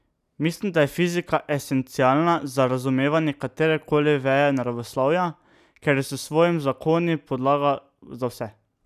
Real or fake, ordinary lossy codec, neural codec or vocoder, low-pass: real; none; none; 14.4 kHz